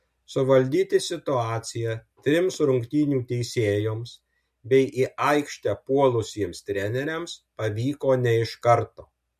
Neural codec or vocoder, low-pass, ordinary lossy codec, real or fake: none; 14.4 kHz; MP3, 64 kbps; real